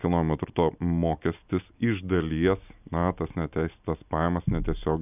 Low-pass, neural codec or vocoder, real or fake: 3.6 kHz; none; real